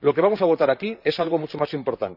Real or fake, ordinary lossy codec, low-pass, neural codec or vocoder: fake; none; 5.4 kHz; vocoder, 44.1 kHz, 128 mel bands, Pupu-Vocoder